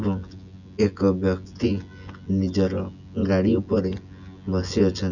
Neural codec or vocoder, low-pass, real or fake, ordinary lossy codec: vocoder, 24 kHz, 100 mel bands, Vocos; 7.2 kHz; fake; none